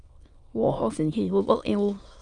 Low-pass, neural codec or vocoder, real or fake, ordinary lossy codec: 9.9 kHz; autoencoder, 22.05 kHz, a latent of 192 numbers a frame, VITS, trained on many speakers; fake; Opus, 32 kbps